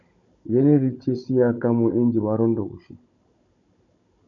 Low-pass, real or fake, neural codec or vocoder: 7.2 kHz; fake; codec, 16 kHz, 16 kbps, FunCodec, trained on Chinese and English, 50 frames a second